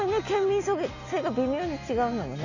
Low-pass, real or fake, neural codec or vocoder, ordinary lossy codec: 7.2 kHz; real; none; Opus, 64 kbps